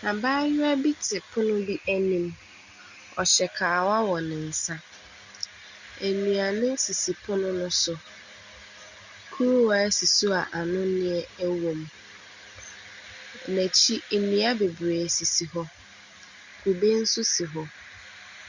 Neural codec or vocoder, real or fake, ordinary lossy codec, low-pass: none; real; Opus, 64 kbps; 7.2 kHz